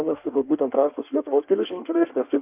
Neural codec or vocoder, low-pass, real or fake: codec, 16 kHz in and 24 kHz out, 1.1 kbps, FireRedTTS-2 codec; 3.6 kHz; fake